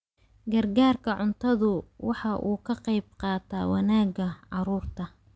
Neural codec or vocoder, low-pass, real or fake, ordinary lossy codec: none; none; real; none